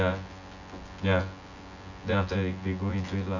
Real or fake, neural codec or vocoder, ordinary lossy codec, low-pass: fake; vocoder, 24 kHz, 100 mel bands, Vocos; none; 7.2 kHz